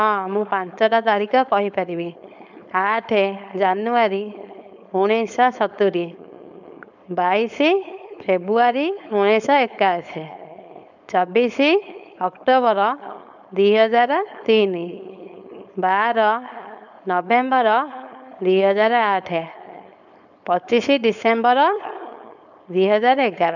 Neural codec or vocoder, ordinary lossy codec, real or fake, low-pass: codec, 16 kHz, 4.8 kbps, FACodec; none; fake; 7.2 kHz